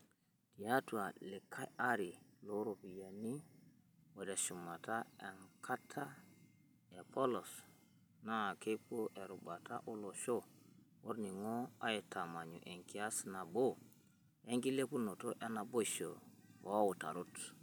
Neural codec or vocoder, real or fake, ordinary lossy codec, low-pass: none; real; none; none